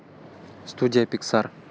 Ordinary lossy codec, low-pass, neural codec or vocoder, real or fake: none; none; none; real